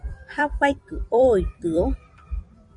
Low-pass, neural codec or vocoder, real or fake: 10.8 kHz; vocoder, 44.1 kHz, 128 mel bands every 256 samples, BigVGAN v2; fake